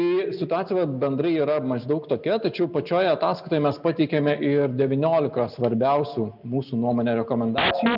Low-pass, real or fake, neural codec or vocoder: 5.4 kHz; real; none